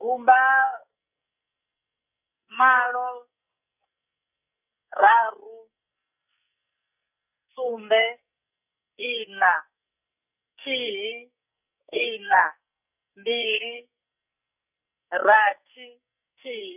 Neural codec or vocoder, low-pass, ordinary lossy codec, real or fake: codec, 44.1 kHz, 2.6 kbps, SNAC; 3.6 kHz; none; fake